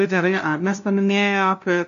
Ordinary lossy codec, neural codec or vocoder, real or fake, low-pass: AAC, 64 kbps; codec, 16 kHz, 0.5 kbps, FunCodec, trained on LibriTTS, 25 frames a second; fake; 7.2 kHz